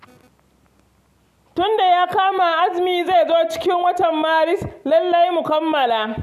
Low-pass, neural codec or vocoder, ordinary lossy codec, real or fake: 14.4 kHz; none; none; real